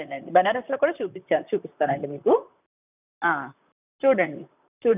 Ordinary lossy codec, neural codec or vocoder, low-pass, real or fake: none; vocoder, 44.1 kHz, 128 mel bands, Pupu-Vocoder; 3.6 kHz; fake